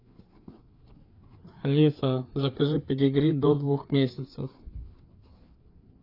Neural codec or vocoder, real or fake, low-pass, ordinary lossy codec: codec, 16 kHz, 4 kbps, FreqCodec, larger model; fake; 5.4 kHz; AAC, 32 kbps